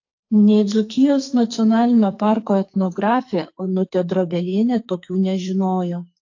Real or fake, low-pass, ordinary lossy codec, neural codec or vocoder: fake; 7.2 kHz; AAC, 48 kbps; codec, 44.1 kHz, 2.6 kbps, SNAC